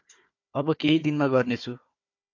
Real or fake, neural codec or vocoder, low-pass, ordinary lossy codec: fake; codec, 16 kHz, 4 kbps, FunCodec, trained on Chinese and English, 50 frames a second; 7.2 kHz; AAC, 48 kbps